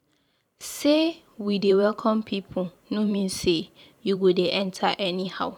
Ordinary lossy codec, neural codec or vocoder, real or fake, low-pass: none; vocoder, 44.1 kHz, 128 mel bands every 512 samples, BigVGAN v2; fake; 19.8 kHz